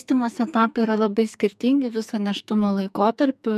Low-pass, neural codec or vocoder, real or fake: 14.4 kHz; codec, 44.1 kHz, 2.6 kbps, SNAC; fake